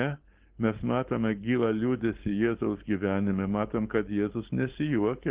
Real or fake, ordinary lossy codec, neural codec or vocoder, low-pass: fake; Opus, 16 kbps; codec, 16 kHz, 4.8 kbps, FACodec; 3.6 kHz